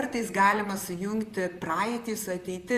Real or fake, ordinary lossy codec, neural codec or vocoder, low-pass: fake; Opus, 64 kbps; vocoder, 44.1 kHz, 128 mel bands, Pupu-Vocoder; 14.4 kHz